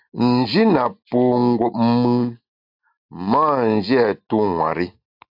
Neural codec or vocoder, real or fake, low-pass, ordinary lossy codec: none; real; 5.4 kHz; AAC, 48 kbps